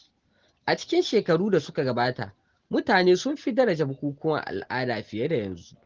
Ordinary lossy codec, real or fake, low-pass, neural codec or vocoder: Opus, 16 kbps; real; 7.2 kHz; none